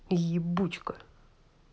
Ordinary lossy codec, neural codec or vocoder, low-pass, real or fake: none; none; none; real